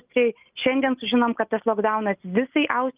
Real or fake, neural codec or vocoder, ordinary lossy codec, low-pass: real; none; Opus, 24 kbps; 3.6 kHz